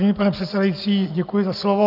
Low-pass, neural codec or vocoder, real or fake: 5.4 kHz; none; real